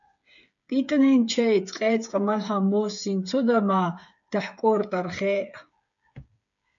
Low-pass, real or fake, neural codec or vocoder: 7.2 kHz; fake; codec, 16 kHz, 8 kbps, FreqCodec, smaller model